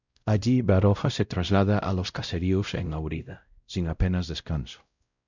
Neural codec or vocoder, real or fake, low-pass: codec, 16 kHz, 0.5 kbps, X-Codec, WavLM features, trained on Multilingual LibriSpeech; fake; 7.2 kHz